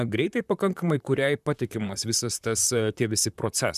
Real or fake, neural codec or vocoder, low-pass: fake; vocoder, 44.1 kHz, 128 mel bands, Pupu-Vocoder; 14.4 kHz